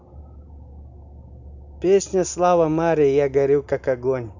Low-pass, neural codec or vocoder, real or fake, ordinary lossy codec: 7.2 kHz; none; real; none